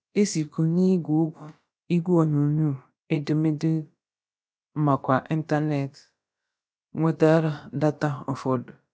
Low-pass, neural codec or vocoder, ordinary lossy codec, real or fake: none; codec, 16 kHz, about 1 kbps, DyCAST, with the encoder's durations; none; fake